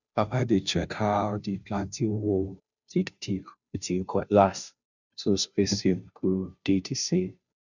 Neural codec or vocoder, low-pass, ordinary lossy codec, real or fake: codec, 16 kHz, 0.5 kbps, FunCodec, trained on Chinese and English, 25 frames a second; 7.2 kHz; none; fake